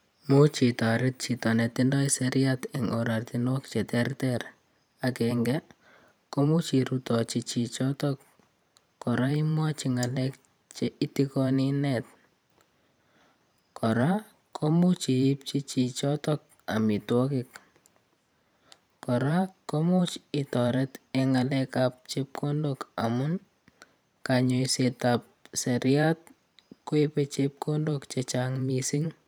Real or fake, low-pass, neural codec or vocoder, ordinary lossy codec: fake; none; vocoder, 44.1 kHz, 128 mel bands every 512 samples, BigVGAN v2; none